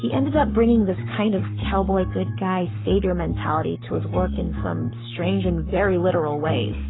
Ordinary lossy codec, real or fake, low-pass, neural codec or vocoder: AAC, 16 kbps; fake; 7.2 kHz; codec, 44.1 kHz, 7.8 kbps, Pupu-Codec